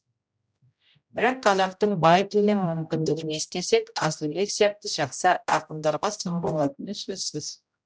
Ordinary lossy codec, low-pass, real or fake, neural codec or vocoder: none; none; fake; codec, 16 kHz, 0.5 kbps, X-Codec, HuBERT features, trained on general audio